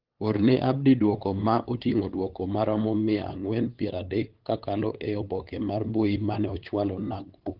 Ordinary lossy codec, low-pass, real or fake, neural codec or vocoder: Opus, 16 kbps; 5.4 kHz; fake; codec, 16 kHz, 8 kbps, FunCodec, trained on LibriTTS, 25 frames a second